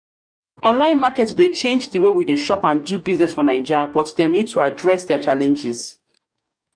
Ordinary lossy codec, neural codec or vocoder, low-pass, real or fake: none; codec, 44.1 kHz, 2.6 kbps, DAC; 9.9 kHz; fake